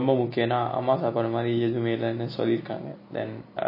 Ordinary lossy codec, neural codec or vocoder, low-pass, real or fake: MP3, 24 kbps; none; 5.4 kHz; real